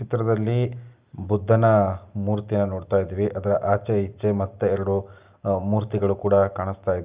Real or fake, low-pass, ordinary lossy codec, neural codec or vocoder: real; 3.6 kHz; Opus, 24 kbps; none